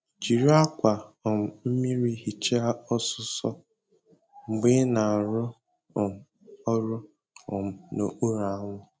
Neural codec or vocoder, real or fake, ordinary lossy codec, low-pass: none; real; none; none